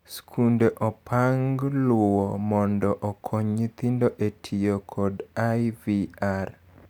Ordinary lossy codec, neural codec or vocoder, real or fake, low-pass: none; none; real; none